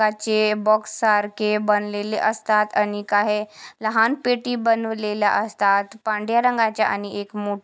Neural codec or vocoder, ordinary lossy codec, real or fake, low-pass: none; none; real; none